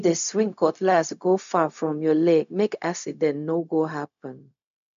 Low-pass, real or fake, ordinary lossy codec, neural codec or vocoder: 7.2 kHz; fake; none; codec, 16 kHz, 0.4 kbps, LongCat-Audio-Codec